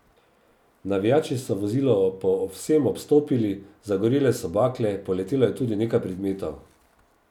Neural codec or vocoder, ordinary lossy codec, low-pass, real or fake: none; none; 19.8 kHz; real